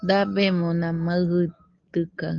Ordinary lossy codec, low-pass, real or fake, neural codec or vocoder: Opus, 32 kbps; 7.2 kHz; real; none